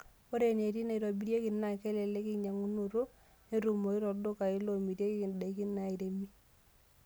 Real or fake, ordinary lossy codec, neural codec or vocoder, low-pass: real; none; none; none